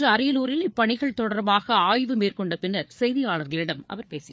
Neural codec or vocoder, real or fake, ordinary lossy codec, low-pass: codec, 16 kHz, 4 kbps, FreqCodec, larger model; fake; none; none